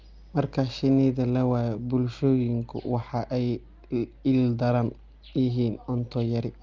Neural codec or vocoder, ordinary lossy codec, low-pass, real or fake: none; Opus, 24 kbps; 7.2 kHz; real